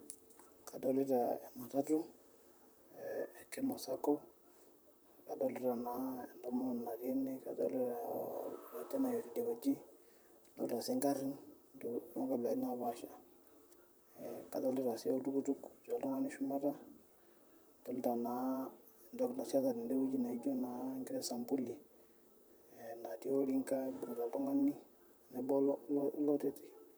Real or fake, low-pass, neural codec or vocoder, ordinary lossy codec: fake; none; vocoder, 44.1 kHz, 128 mel bands, Pupu-Vocoder; none